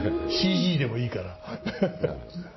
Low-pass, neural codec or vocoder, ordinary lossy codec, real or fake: 7.2 kHz; none; MP3, 24 kbps; real